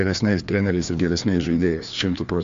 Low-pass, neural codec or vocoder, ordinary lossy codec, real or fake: 7.2 kHz; codec, 16 kHz, 2 kbps, FreqCodec, larger model; AAC, 64 kbps; fake